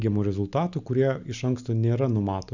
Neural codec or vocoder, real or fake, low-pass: none; real; 7.2 kHz